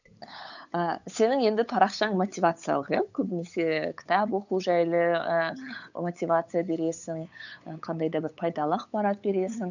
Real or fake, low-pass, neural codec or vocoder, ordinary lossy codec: fake; 7.2 kHz; codec, 16 kHz, 8 kbps, FunCodec, trained on LibriTTS, 25 frames a second; none